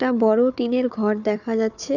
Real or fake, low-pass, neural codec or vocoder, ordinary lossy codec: fake; 7.2 kHz; codec, 16 kHz, 4 kbps, FunCodec, trained on Chinese and English, 50 frames a second; none